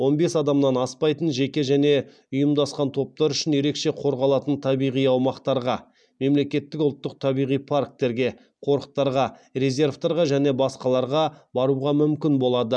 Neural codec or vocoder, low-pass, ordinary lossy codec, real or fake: none; 9.9 kHz; none; real